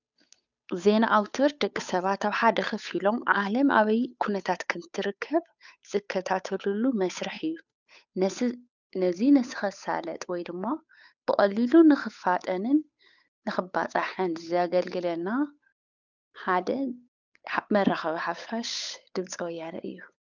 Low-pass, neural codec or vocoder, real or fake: 7.2 kHz; codec, 16 kHz, 8 kbps, FunCodec, trained on Chinese and English, 25 frames a second; fake